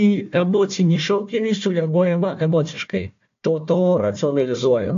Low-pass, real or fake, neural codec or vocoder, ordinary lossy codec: 7.2 kHz; fake; codec, 16 kHz, 1 kbps, FunCodec, trained on Chinese and English, 50 frames a second; AAC, 64 kbps